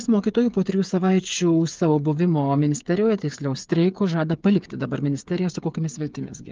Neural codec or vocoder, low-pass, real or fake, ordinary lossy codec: codec, 16 kHz, 8 kbps, FreqCodec, smaller model; 7.2 kHz; fake; Opus, 32 kbps